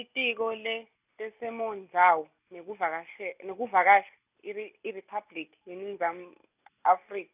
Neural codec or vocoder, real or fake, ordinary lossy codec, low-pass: none; real; none; 3.6 kHz